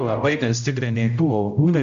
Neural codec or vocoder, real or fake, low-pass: codec, 16 kHz, 0.5 kbps, X-Codec, HuBERT features, trained on general audio; fake; 7.2 kHz